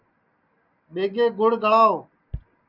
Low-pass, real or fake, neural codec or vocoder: 5.4 kHz; real; none